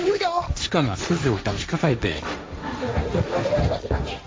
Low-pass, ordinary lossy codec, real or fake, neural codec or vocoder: none; none; fake; codec, 16 kHz, 1.1 kbps, Voila-Tokenizer